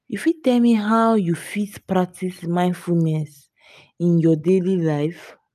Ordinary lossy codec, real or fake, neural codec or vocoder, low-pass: none; real; none; 14.4 kHz